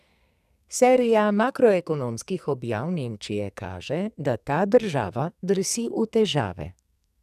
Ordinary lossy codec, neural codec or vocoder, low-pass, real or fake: none; codec, 44.1 kHz, 2.6 kbps, SNAC; 14.4 kHz; fake